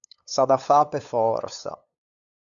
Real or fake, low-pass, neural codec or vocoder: fake; 7.2 kHz; codec, 16 kHz, 8 kbps, FunCodec, trained on LibriTTS, 25 frames a second